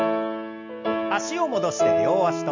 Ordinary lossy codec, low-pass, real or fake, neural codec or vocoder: MP3, 48 kbps; 7.2 kHz; real; none